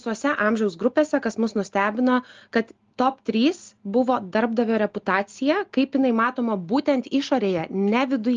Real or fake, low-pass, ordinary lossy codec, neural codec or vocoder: real; 7.2 kHz; Opus, 24 kbps; none